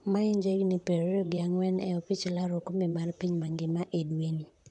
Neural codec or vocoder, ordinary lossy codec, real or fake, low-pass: vocoder, 44.1 kHz, 128 mel bands, Pupu-Vocoder; none; fake; 10.8 kHz